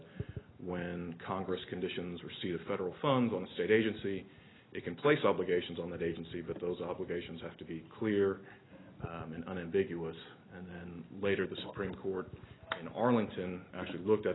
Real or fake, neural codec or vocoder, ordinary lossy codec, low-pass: real; none; AAC, 16 kbps; 7.2 kHz